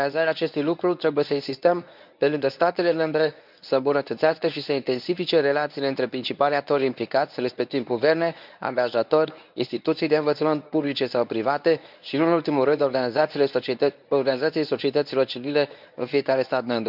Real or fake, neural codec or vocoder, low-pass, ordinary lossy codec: fake; codec, 24 kHz, 0.9 kbps, WavTokenizer, medium speech release version 1; 5.4 kHz; none